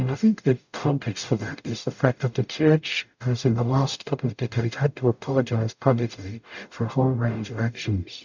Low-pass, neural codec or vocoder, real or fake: 7.2 kHz; codec, 44.1 kHz, 0.9 kbps, DAC; fake